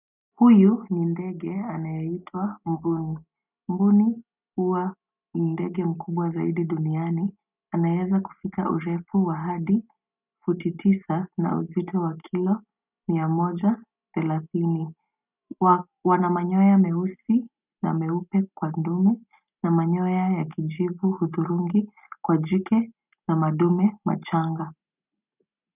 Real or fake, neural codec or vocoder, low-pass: real; none; 3.6 kHz